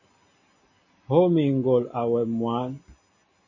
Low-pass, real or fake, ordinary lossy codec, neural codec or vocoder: 7.2 kHz; real; MP3, 32 kbps; none